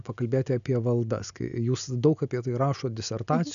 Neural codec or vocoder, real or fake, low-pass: none; real; 7.2 kHz